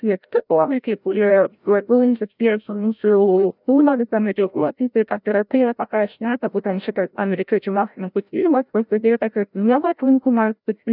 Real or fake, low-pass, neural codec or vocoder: fake; 5.4 kHz; codec, 16 kHz, 0.5 kbps, FreqCodec, larger model